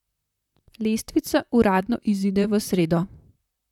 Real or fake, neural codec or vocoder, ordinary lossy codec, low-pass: fake; vocoder, 44.1 kHz, 128 mel bands, Pupu-Vocoder; none; 19.8 kHz